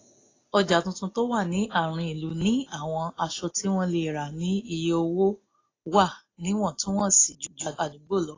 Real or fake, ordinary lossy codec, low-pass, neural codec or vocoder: real; AAC, 32 kbps; 7.2 kHz; none